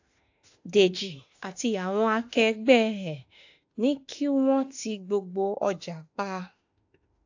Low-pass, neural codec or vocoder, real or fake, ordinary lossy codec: 7.2 kHz; autoencoder, 48 kHz, 32 numbers a frame, DAC-VAE, trained on Japanese speech; fake; AAC, 48 kbps